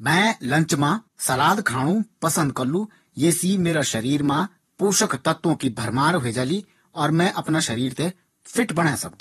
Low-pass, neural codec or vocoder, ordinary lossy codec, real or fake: 19.8 kHz; vocoder, 44.1 kHz, 128 mel bands, Pupu-Vocoder; AAC, 32 kbps; fake